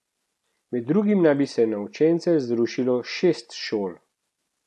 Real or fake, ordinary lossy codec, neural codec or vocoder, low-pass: real; none; none; none